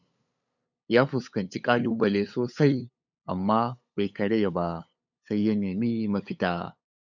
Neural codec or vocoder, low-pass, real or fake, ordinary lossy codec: codec, 16 kHz, 8 kbps, FunCodec, trained on LibriTTS, 25 frames a second; 7.2 kHz; fake; none